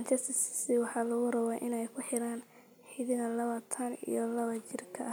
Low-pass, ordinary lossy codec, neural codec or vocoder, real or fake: none; none; none; real